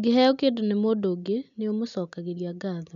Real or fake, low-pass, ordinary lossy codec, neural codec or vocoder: real; 7.2 kHz; none; none